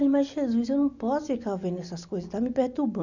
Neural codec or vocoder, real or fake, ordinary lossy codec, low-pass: none; real; none; 7.2 kHz